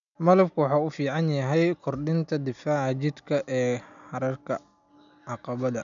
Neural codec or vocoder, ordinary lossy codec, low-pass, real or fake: none; none; 7.2 kHz; real